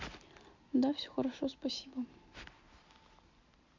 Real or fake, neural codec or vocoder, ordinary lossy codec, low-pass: real; none; MP3, 48 kbps; 7.2 kHz